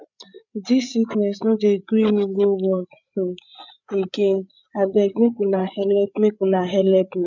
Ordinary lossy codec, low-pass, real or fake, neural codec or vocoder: none; 7.2 kHz; fake; codec, 16 kHz, 8 kbps, FreqCodec, larger model